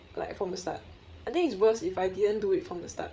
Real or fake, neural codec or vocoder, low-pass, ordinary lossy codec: fake; codec, 16 kHz, 8 kbps, FreqCodec, larger model; none; none